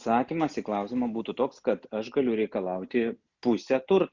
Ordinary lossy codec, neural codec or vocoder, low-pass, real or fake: Opus, 64 kbps; none; 7.2 kHz; real